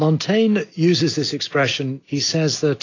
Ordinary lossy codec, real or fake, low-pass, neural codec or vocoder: AAC, 32 kbps; real; 7.2 kHz; none